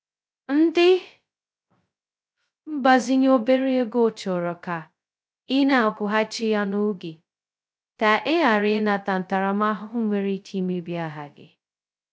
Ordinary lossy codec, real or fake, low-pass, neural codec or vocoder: none; fake; none; codec, 16 kHz, 0.2 kbps, FocalCodec